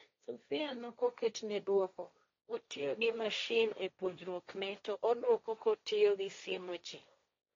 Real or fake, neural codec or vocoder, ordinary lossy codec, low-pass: fake; codec, 16 kHz, 1.1 kbps, Voila-Tokenizer; AAC, 32 kbps; 7.2 kHz